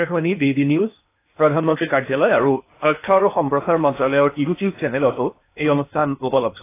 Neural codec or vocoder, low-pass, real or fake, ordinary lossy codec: codec, 16 kHz in and 24 kHz out, 0.8 kbps, FocalCodec, streaming, 65536 codes; 3.6 kHz; fake; AAC, 24 kbps